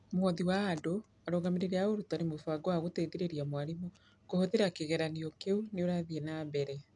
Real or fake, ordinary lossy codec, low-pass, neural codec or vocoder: real; none; 10.8 kHz; none